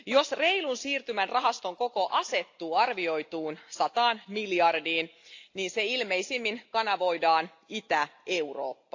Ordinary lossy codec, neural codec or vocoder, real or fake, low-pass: AAC, 48 kbps; none; real; 7.2 kHz